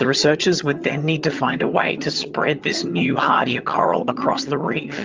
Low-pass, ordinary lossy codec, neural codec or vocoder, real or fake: 7.2 kHz; Opus, 32 kbps; vocoder, 22.05 kHz, 80 mel bands, HiFi-GAN; fake